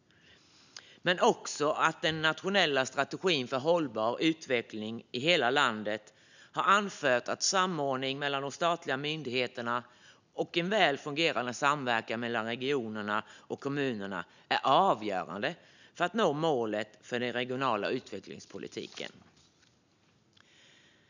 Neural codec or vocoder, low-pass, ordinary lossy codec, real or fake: none; 7.2 kHz; none; real